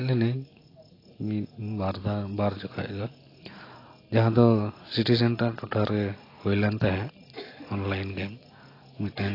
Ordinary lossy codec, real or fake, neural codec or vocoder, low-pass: AAC, 24 kbps; real; none; 5.4 kHz